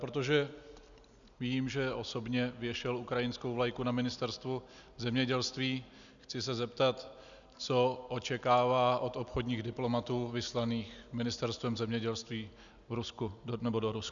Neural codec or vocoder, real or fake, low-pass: none; real; 7.2 kHz